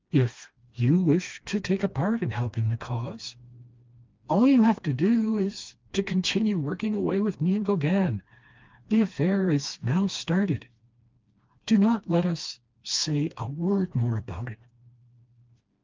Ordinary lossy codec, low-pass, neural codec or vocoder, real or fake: Opus, 32 kbps; 7.2 kHz; codec, 16 kHz, 2 kbps, FreqCodec, smaller model; fake